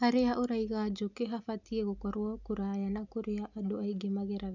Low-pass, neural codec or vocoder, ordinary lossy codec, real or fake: 7.2 kHz; none; none; real